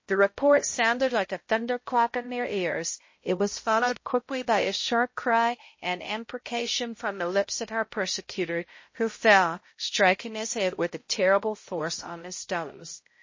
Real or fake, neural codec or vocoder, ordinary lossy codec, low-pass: fake; codec, 16 kHz, 0.5 kbps, X-Codec, HuBERT features, trained on balanced general audio; MP3, 32 kbps; 7.2 kHz